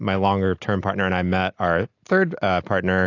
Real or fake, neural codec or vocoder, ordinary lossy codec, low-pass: real; none; AAC, 48 kbps; 7.2 kHz